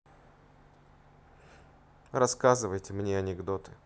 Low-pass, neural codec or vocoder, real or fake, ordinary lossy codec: none; none; real; none